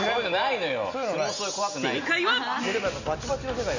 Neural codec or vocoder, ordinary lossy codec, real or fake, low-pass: none; none; real; 7.2 kHz